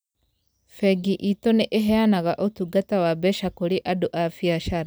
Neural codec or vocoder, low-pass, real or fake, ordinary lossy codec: none; none; real; none